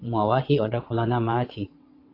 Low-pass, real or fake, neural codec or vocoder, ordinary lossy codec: 5.4 kHz; fake; vocoder, 22.05 kHz, 80 mel bands, WaveNeXt; AAC, 32 kbps